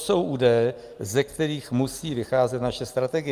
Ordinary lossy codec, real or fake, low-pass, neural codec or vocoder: Opus, 32 kbps; real; 14.4 kHz; none